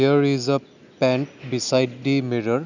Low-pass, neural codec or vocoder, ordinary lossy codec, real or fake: 7.2 kHz; none; none; real